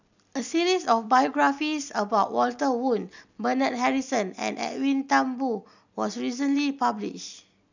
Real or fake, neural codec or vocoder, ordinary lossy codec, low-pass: real; none; none; 7.2 kHz